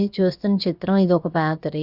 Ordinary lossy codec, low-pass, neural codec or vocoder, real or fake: Opus, 64 kbps; 5.4 kHz; codec, 16 kHz, about 1 kbps, DyCAST, with the encoder's durations; fake